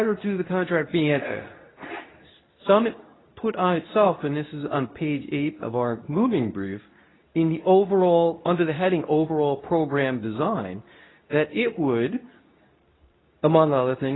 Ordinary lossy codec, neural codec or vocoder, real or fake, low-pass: AAC, 16 kbps; codec, 24 kHz, 0.9 kbps, WavTokenizer, medium speech release version 2; fake; 7.2 kHz